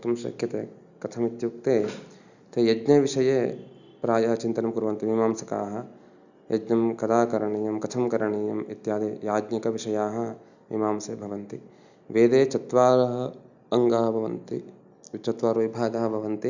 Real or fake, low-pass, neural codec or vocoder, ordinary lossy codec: real; 7.2 kHz; none; none